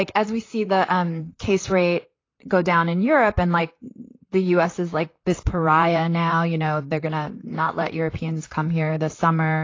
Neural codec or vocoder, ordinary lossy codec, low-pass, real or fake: vocoder, 44.1 kHz, 128 mel bands, Pupu-Vocoder; AAC, 32 kbps; 7.2 kHz; fake